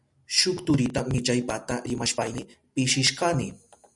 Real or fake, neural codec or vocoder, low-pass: real; none; 10.8 kHz